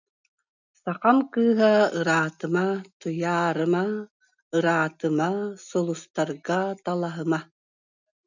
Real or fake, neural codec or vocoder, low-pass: real; none; 7.2 kHz